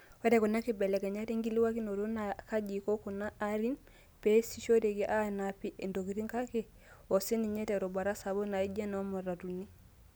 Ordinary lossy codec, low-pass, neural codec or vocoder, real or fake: none; none; none; real